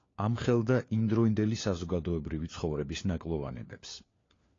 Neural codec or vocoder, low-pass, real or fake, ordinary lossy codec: codec, 16 kHz, 4 kbps, FunCodec, trained on LibriTTS, 50 frames a second; 7.2 kHz; fake; AAC, 32 kbps